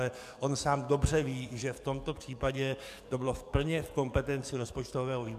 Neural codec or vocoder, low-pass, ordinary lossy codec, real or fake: codec, 44.1 kHz, 7.8 kbps, DAC; 14.4 kHz; MP3, 96 kbps; fake